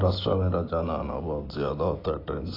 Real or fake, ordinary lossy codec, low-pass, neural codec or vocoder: real; AAC, 24 kbps; 5.4 kHz; none